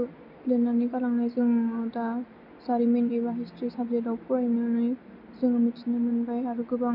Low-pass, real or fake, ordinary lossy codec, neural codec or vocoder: 5.4 kHz; real; none; none